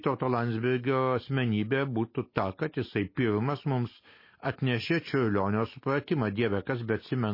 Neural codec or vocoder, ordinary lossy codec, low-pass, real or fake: none; MP3, 24 kbps; 5.4 kHz; real